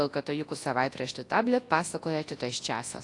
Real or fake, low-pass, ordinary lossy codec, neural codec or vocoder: fake; 10.8 kHz; AAC, 48 kbps; codec, 24 kHz, 0.9 kbps, WavTokenizer, large speech release